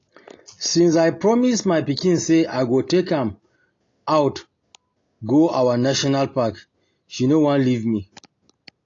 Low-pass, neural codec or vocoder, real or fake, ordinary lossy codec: 7.2 kHz; none; real; AAC, 32 kbps